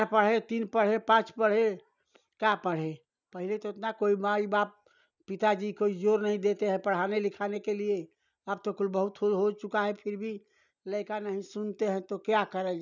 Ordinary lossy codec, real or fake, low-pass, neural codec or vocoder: none; real; 7.2 kHz; none